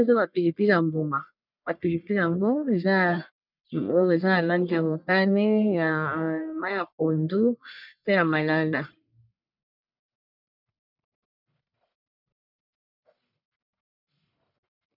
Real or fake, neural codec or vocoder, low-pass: fake; codec, 44.1 kHz, 1.7 kbps, Pupu-Codec; 5.4 kHz